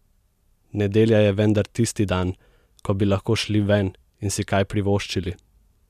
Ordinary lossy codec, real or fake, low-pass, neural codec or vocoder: MP3, 96 kbps; real; 14.4 kHz; none